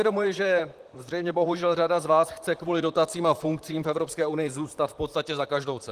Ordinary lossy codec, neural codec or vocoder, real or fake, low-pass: Opus, 24 kbps; vocoder, 44.1 kHz, 128 mel bands, Pupu-Vocoder; fake; 14.4 kHz